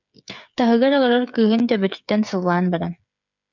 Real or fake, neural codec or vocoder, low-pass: fake; codec, 16 kHz, 8 kbps, FreqCodec, smaller model; 7.2 kHz